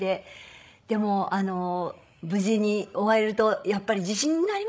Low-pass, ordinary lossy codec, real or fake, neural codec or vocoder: none; none; fake; codec, 16 kHz, 16 kbps, FreqCodec, larger model